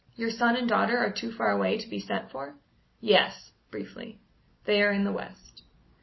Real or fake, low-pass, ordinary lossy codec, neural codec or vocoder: real; 7.2 kHz; MP3, 24 kbps; none